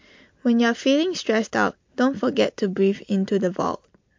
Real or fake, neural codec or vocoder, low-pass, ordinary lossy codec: real; none; 7.2 kHz; MP3, 48 kbps